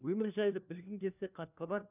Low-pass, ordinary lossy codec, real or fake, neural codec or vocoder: 3.6 kHz; none; fake; codec, 16 kHz, 1 kbps, FunCodec, trained on LibriTTS, 50 frames a second